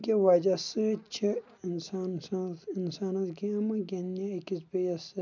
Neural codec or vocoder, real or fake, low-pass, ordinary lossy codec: none; real; 7.2 kHz; none